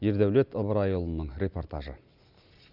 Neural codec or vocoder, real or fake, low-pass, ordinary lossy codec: none; real; 5.4 kHz; none